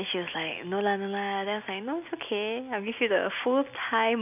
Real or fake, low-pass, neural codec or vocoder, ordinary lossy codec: real; 3.6 kHz; none; none